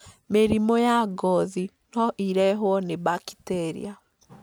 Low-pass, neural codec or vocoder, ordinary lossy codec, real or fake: none; none; none; real